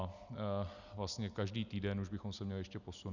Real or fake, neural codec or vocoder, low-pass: real; none; 7.2 kHz